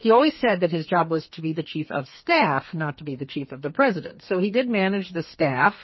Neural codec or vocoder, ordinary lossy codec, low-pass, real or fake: codec, 32 kHz, 1.9 kbps, SNAC; MP3, 24 kbps; 7.2 kHz; fake